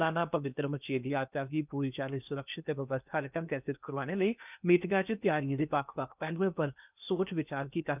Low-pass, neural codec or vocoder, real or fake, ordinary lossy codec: 3.6 kHz; codec, 16 kHz in and 24 kHz out, 0.8 kbps, FocalCodec, streaming, 65536 codes; fake; none